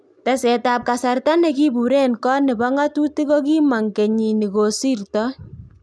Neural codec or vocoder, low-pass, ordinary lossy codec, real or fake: none; none; none; real